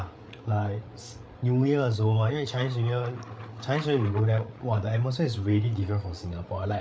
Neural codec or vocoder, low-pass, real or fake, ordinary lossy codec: codec, 16 kHz, 8 kbps, FreqCodec, larger model; none; fake; none